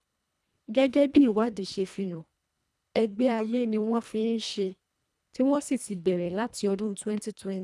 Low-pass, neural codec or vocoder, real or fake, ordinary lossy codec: none; codec, 24 kHz, 1.5 kbps, HILCodec; fake; none